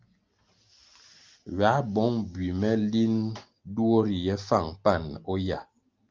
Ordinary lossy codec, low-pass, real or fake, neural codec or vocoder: Opus, 24 kbps; 7.2 kHz; real; none